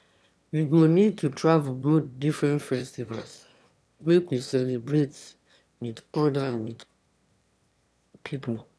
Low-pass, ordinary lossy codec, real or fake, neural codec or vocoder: none; none; fake; autoencoder, 22.05 kHz, a latent of 192 numbers a frame, VITS, trained on one speaker